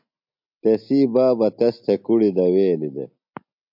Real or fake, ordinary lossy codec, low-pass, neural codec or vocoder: real; MP3, 48 kbps; 5.4 kHz; none